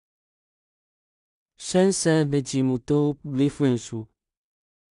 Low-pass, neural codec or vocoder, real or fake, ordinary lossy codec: 10.8 kHz; codec, 16 kHz in and 24 kHz out, 0.4 kbps, LongCat-Audio-Codec, two codebook decoder; fake; none